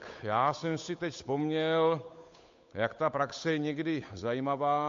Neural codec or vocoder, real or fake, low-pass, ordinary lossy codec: codec, 16 kHz, 8 kbps, FunCodec, trained on Chinese and English, 25 frames a second; fake; 7.2 kHz; MP3, 48 kbps